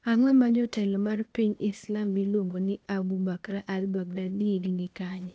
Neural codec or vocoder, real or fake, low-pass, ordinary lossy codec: codec, 16 kHz, 0.8 kbps, ZipCodec; fake; none; none